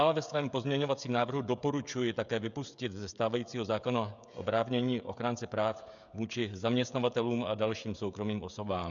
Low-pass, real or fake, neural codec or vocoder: 7.2 kHz; fake; codec, 16 kHz, 16 kbps, FreqCodec, smaller model